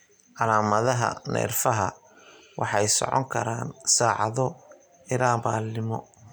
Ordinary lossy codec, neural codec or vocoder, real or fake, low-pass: none; none; real; none